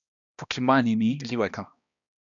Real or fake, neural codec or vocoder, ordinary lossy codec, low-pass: fake; codec, 16 kHz, 1 kbps, X-Codec, HuBERT features, trained on balanced general audio; MP3, 96 kbps; 7.2 kHz